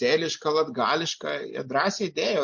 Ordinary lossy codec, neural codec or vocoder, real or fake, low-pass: MP3, 48 kbps; none; real; 7.2 kHz